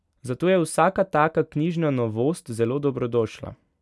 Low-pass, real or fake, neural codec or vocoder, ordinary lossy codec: none; real; none; none